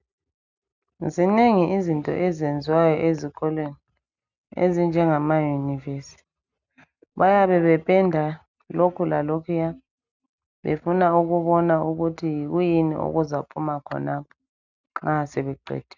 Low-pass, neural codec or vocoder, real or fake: 7.2 kHz; none; real